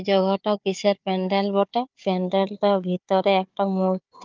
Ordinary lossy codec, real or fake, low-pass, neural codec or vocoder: Opus, 24 kbps; fake; 7.2 kHz; codec, 16 kHz, 4 kbps, FunCodec, trained on Chinese and English, 50 frames a second